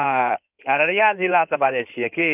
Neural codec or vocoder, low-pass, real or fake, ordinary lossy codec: codec, 16 kHz in and 24 kHz out, 2.2 kbps, FireRedTTS-2 codec; 3.6 kHz; fake; none